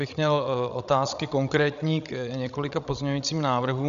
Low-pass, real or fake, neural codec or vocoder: 7.2 kHz; fake; codec, 16 kHz, 16 kbps, FreqCodec, larger model